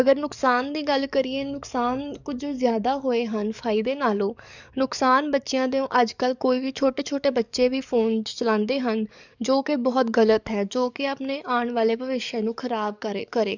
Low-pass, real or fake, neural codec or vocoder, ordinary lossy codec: 7.2 kHz; fake; codec, 44.1 kHz, 7.8 kbps, DAC; none